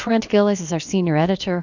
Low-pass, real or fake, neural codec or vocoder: 7.2 kHz; fake; codec, 16 kHz, about 1 kbps, DyCAST, with the encoder's durations